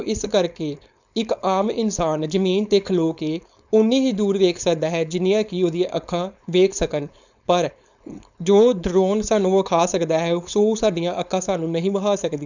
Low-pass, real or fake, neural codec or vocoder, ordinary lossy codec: 7.2 kHz; fake; codec, 16 kHz, 4.8 kbps, FACodec; none